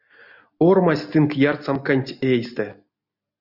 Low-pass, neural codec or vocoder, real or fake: 5.4 kHz; none; real